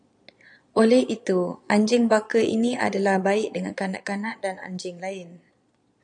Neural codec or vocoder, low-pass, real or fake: vocoder, 22.05 kHz, 80 mel bands, Vocos; 9.9 kHz; fake